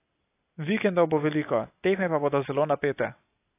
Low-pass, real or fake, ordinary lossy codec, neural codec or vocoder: 3.6 kHz; real; AAC, 24 kbps; none